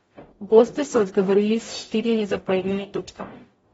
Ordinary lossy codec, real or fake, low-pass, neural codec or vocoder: AAC, 24 kbps; fake; 19.8 kHz; codec, 44.1 kHz, 0.9 kbps, DAC